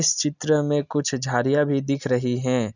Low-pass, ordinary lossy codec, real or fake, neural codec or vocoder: 7.2 kHz; none; real; none